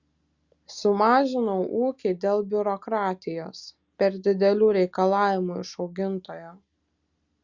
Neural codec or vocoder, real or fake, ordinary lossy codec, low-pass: none; real; Opus, 64 kbps; 7.2 kHz